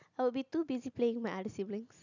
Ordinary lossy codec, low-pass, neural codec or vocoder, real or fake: none; 7.2 kHz; none; real